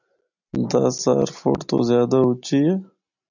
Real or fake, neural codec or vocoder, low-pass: real; none; 7.2 kHz